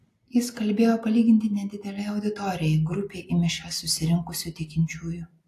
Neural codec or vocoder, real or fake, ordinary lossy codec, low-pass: none; real; AAC, 64 kbps; 14.4 kHz